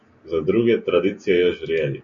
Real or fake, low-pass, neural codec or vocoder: real; 7.2 kHz; none